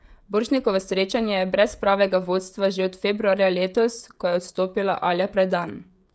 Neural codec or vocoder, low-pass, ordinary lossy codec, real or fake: codec, 16 kHz, 16 kbps, FreqCodec, smaller model; none; none; fake